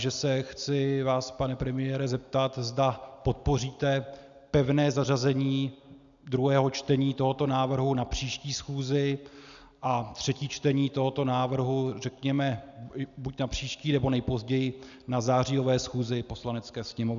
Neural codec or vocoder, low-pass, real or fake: none; 7.2 kHz; real